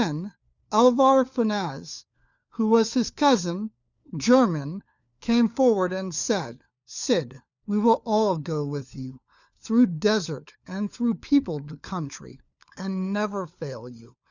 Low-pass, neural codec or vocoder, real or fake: 7.2 kHz; codec, 16 kHz, 4 kbps, FunCodec, trained on LibriTTS, 50 frames a second; fake